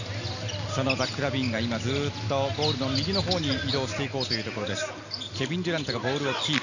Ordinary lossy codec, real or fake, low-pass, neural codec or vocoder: none; real; 7.2 kHz; none